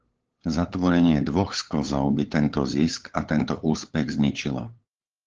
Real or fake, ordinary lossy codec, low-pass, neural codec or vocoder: fake; Opus, 16 kbps; 7.2 kHz; codec, 16 kHz, 8 kbps, FunCodec, trained on LibriTTS, 25 frames a second